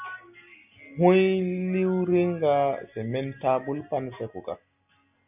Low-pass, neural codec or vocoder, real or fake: 3.6 kHz; none; real